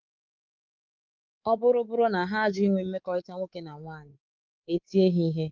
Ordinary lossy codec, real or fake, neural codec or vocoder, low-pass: Opus, 32 kbps; fake; codec, 24 kHz, 3.1 kbps, DualCodec; 7.2 kHz